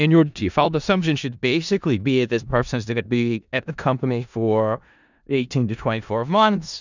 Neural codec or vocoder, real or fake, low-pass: codec, 16 kHz in and 24 kHz out, 0.4 kbps, LongCat-Audio-Codec, four codebook decoder; fake; 7.2 kHz